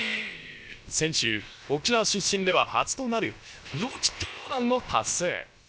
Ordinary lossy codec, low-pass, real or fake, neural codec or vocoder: none; none; fake; codec, 16 kHz, about 1 kbps, DyCAST, with the encoder's durations